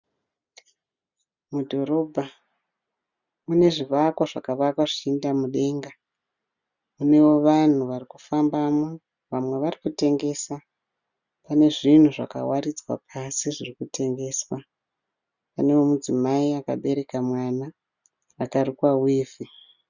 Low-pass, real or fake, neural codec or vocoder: 7.2 kHz; real; none